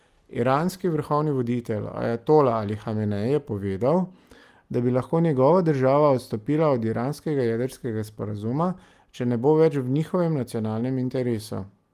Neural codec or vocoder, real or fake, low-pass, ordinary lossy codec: none; real; 14.4 kHz; Opus, 32 kbps